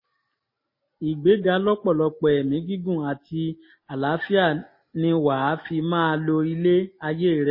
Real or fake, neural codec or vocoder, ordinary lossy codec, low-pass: real; none; MP3, 24 kbps; 5.4 kHz